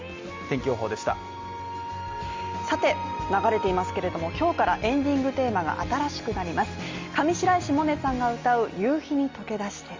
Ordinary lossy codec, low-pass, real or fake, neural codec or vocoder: Opus, 32 kbps; 7.2 kHz; real; none